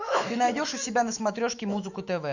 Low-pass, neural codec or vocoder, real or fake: 7.2 kHz; autoencoder, 48 kHz, 128 numbers a frame, DAC-VAE, trained on Japanese speech; fake